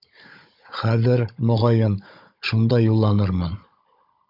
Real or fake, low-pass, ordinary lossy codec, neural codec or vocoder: fake; 5.4 kHz; MP3, 48 kbps; codec, 16 kHz, 16 kbps, FunCodec, trained on Chinese and English, 50 frames a second